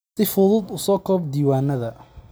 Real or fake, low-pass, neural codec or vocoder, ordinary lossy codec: real; none; none; none